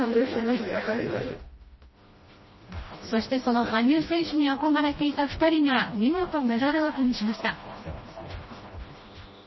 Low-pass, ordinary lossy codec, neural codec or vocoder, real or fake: 7.2 kHz; MP3, 24 kbps; codec, 16 kHz, 1 kbps, FreqCodec, smaller model; fake